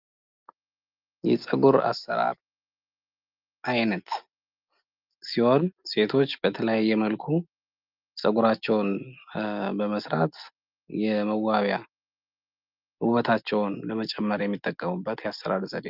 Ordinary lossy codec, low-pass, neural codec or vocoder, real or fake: Opus, 24 kbps; 5.4 kHz; none; real